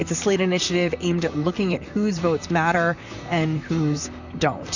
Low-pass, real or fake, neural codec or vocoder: 7.2 kHz; fake; vocoder, 44.1 kHz, 128 mel bands, Pupu-Vocoder